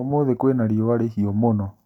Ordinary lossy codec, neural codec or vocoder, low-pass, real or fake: none; none; 19.8 kHz; real